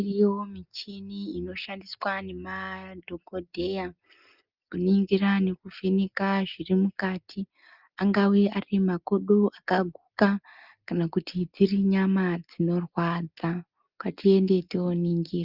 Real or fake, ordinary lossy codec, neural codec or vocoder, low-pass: real; Opus, 24 kbps; none; 5.4 kHz